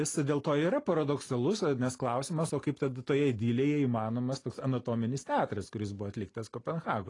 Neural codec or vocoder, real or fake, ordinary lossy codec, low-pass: none; real; AAC, 32 kbps; 10.8 kHz